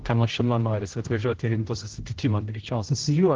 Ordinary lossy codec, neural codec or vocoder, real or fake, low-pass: Opus, 16 kbps; codec, 16 kHz, 0.5 kbps, X-Codec, HuBERT features, trained on general audio; fake; 7.2 kHz